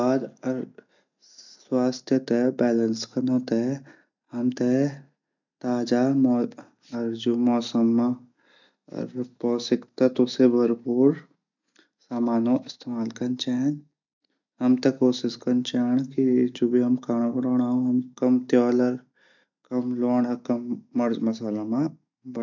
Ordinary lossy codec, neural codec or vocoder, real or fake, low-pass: none; none; real; 7.2 kHz